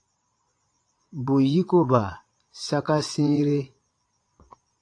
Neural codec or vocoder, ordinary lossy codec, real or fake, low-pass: vocoder, 22.05 kHz, 80 mel bands, Vocos; AAC, 48 kbps; fake; 9.9 kHz